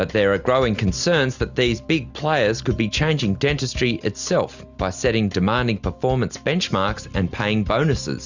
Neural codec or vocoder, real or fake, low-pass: none; real; 7.2 kHz